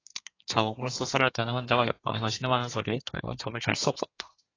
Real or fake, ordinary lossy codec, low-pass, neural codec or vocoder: fake; AAC, 32 kbps; 7.2 kHz; codec, 32 kHz, 1.9 kbps, SNAC